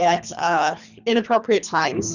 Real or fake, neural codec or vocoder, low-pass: fake; codec, 24 kHz, 3 kbps, HILCodec; 7.2 kHz